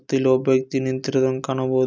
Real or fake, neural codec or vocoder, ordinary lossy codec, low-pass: real; none; none; none